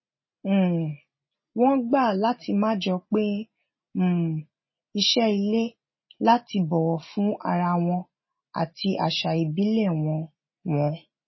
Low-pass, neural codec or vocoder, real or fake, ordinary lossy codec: 7.2 kHz; none; real; MP3, 24 kbps